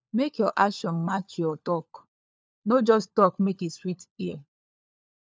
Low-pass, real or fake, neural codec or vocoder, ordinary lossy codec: none; fake; codec, 16 kHz, 4 kbps, FunCodec, trained on LibriTTS, 50 frames a second; none